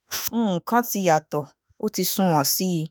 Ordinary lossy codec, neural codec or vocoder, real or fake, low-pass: none; autoencoder, 48 kHz, 32 numbers a frame, DAC-VAE, trained on Japanese speech; fake; none